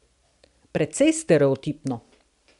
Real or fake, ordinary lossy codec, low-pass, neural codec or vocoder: real; none; 10.8 kHz; none